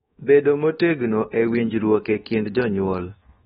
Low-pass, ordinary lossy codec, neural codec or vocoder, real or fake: 10.8 kHz; AAC, 16 kbps; codec, 24 kHz, 1.2 kbps, DualCodec; fake